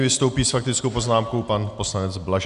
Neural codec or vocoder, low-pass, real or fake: none; 10.8 kHz; real